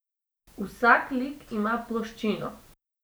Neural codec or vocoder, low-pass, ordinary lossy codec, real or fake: none; none; none; real